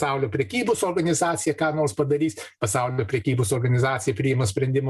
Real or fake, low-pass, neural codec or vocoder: real; 14.4 kHz; none